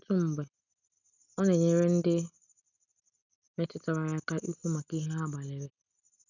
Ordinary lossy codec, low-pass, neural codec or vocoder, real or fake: none; 7.2 kHz; none; real